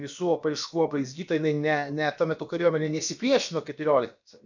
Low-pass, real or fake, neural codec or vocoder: 7.2 kHz; fake; codec, 16 kHz, about 1 kbps, DyCAST, with the encoder's durations